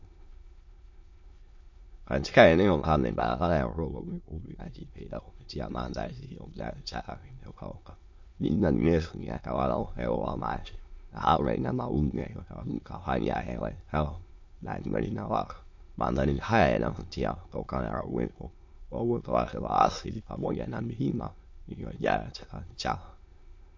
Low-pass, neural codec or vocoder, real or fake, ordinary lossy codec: 7.2 kHz; autoencoder, 22.05 kHz, a latent of 192 numbers a frame, VITS, trained on many speakers; fake; MP3, 48 kbps